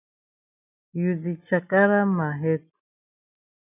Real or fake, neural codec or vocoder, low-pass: real; none; 3.6 kHz